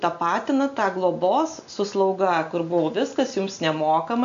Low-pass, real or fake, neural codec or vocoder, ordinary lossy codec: 7.2 kHz; real; none; AAC, 64 kbps